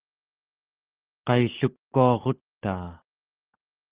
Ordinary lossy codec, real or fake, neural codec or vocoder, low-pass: Opus, 16 kbps; real; none; 3.6 kHz